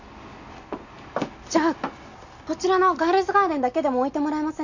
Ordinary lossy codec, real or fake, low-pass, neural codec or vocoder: none; real; 7.2 kHz; none